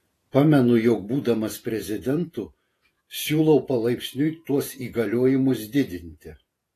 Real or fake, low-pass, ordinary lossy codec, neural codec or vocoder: fake; 14.4 kHz; AAC, 48 kbps; vocoder, 48 kHz, 128 mel bands, Vocos